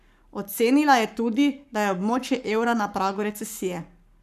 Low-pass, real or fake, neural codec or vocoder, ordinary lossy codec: 14.4 kHz; fake; codec, 44.1 kHz, 7.8 kbps, Pupu-Codec; none